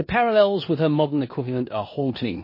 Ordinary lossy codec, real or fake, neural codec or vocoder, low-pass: MP3, 24 kbps; fake; codec, 16 kHz in and 24 kHz out, 0.9 kbps, LongCat-Audio-Codec, four codebook decoder; 5.4 kHz